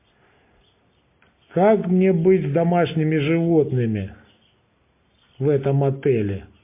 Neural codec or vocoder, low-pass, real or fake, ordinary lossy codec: none; 3.6 kHz; real; MP3, 24 kbps